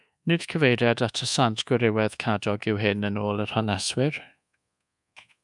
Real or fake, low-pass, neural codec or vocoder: fake; 10.8 kHz; codec, 24 kHz, 1.2 kbps, DualCodec